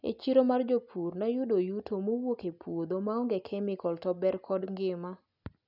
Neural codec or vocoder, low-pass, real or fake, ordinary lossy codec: none; 5.4 kHz; real; none